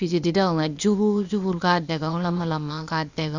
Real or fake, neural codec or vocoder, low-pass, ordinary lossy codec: fake; codec, 16 kHz, 0.8 kbps, ZipCodec; 7.2 kHz; Opus, 64 kbps